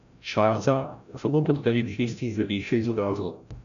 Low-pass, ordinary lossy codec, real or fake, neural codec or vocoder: 7.2 kHz; none; fake; codec, 16 kHz, 0.5 kbps, FreqCodec, larger model